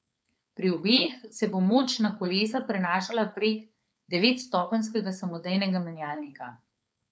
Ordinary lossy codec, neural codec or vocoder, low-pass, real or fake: none; codec, 16 kHz, 4.8 kbps, FACodec; none; fake